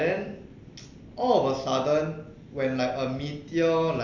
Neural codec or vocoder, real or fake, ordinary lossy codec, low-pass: none; real; MP3, 64 kbps; 7.2 kHz